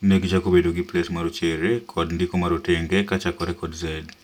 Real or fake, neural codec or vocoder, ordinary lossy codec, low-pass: real; none; none; 19.8 kHz